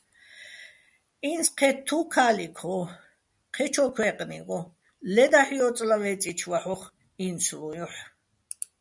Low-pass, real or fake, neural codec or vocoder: 10.8 kHz; real; none